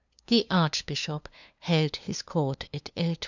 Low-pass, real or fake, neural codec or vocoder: 7.2 kHz; fake; codec, 16 kHz, 2 kbps, FunCodec, trained on LibriTTS, 25 frames a second